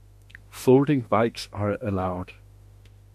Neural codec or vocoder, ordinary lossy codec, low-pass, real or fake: autoencoder, 48 kHz, 32 numbers a frame, DAC-VAE, trained on Japanese speech; MP3, 64 kbps; 14.4 kHz; fake